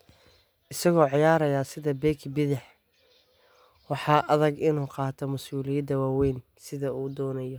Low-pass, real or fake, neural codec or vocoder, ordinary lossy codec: none; real; none; none